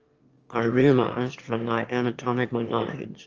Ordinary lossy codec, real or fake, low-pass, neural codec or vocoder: Opus, 24 kbps; fake; 7.2 kHz; autoencoder, 22.05 kHz, a latent of 192 numbers a frame, VITS, trained on one speaker